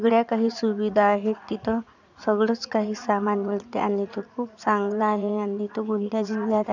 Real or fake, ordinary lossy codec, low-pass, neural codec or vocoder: fake; none; 7.2 kHz; vocoder, 44.1 kHz, 80 mel bands, Vocos